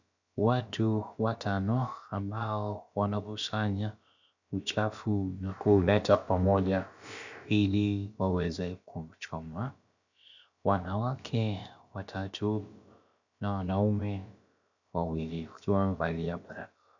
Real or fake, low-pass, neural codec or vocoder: fake; 7.2 kHz; codec, 16 kHz, about 1 kbps, DyCAST, with the encoder's durations